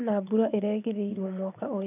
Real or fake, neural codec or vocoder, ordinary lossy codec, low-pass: fake; vocoder, 24 kHz, 100 mel bands, Vocos; none; 3.6 kHz